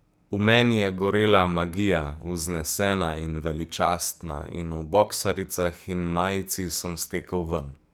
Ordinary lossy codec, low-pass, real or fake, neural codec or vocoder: none; none; fake; codec, 44.1 kHz, 2.6 kbps, SNAC